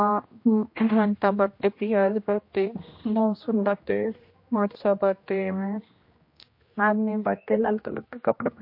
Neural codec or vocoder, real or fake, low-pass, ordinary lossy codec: codec, 16 kHz, 1 kbps, X-Codec, HuBERT features, trained on general audio; fake; 5.4 kHz; MP3, 32 kbps